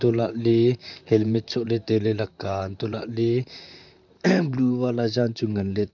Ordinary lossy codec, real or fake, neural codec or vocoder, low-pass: none; fake; vocoder, 44.1 kHz, 128 mel bands, Pupu-Vocoder; 7.2 kHz